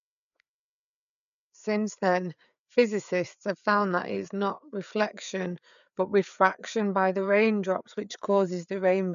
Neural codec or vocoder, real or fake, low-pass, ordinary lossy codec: codec, 16 kHz, 4 kbps, FreqCodec, larger model; fake; 7.2 kHz; none